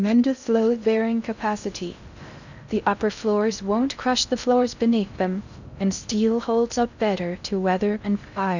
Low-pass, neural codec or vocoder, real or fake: 7.2 kHz; codec, 16 kHz in and 24 kHz out, 0.6 kbps, FocalCodec, streaming, 2048 codes; fake